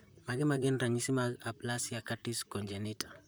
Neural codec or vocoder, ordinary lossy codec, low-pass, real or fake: vocoder, 44.1 kHz, 128 mel bands, Pupu-Vocoder; none; none; fake